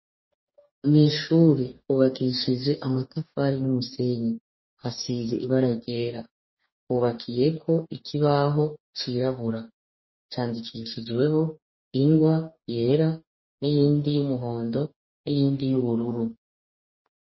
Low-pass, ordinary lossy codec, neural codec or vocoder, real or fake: 7.2 kHz; MP3, 24 kbps; codec, 44.1 kHz, 2.6 kbps, DAC; fake